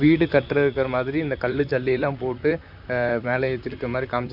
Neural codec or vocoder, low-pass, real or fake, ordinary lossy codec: vocoder, 44.1 kHz, 128 mel bands, Pupu-Vocoder; 5.4 kHz; fake; none